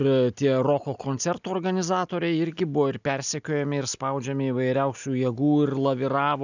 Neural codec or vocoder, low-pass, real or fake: none; 7.2 kHz; real